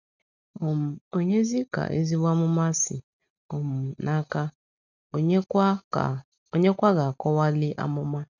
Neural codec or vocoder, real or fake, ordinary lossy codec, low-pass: none; real; none; 7.2 kHz